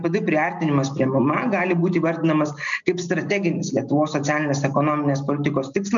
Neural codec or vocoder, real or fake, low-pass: none; real; 7.2 kHz